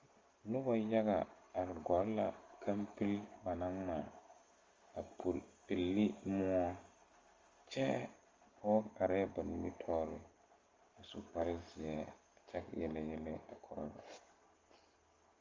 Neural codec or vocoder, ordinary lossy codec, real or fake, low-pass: none; Opus, 32 kbps; real; 7.2 kHz